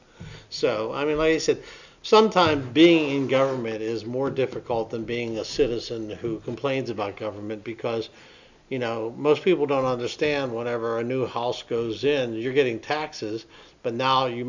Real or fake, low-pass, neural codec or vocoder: real; 7.2 kHz; none